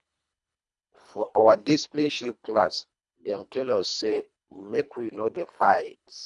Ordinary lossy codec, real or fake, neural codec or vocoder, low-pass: none; fake; codec, 24 kHz, 1.5 kbps, HILCodec; 10.8 kHz